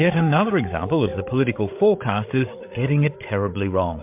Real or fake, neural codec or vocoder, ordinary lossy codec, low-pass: fake; codec, 16 kHz, 8 kbps, FreqCodec, larger model; MP3, 32 kbps; 3.6 kHz